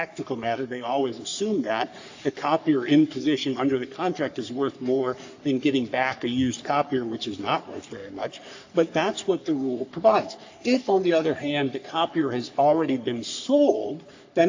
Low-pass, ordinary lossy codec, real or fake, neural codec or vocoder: 7.2 kHz; AAC, 48 kbps; fake; codec, 44.1 kHz, 3.4 kbps, Pupu-Codec